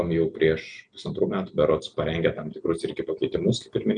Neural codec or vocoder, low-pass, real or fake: none; 9.9 kHz; real